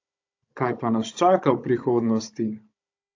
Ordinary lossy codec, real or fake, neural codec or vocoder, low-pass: AAC, 48 kbps; fake; codec, 16 kHz, 16 kbps, FunCodec, trained on Chinese and English, 50 frames a second; 7.2 kHz